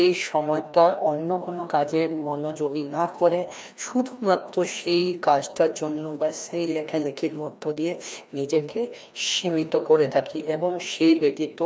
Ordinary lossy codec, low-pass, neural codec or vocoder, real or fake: none; none; codec, 16 kHz, 1 kbps, FreqCodec, larger model; fake